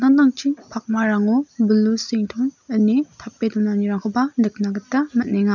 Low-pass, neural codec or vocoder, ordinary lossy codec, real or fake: 7.2 kHz; none; none; real